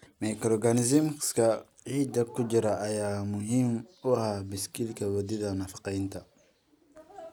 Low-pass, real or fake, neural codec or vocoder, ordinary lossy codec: 19.8 kHz; real; none; none